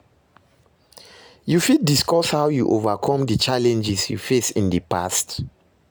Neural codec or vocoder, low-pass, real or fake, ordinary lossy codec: none; none; real; none